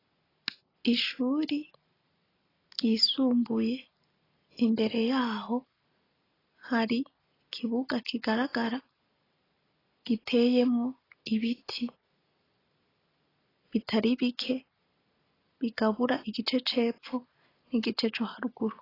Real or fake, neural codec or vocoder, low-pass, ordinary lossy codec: real; none; 5.4 kHz; AAC, 24 kbps